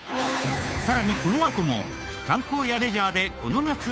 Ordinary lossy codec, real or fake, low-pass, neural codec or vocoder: none; fake; none; codec, 16 kHz, 2 kbps, FunCodec, trained on Chinese and English, 25 frames a second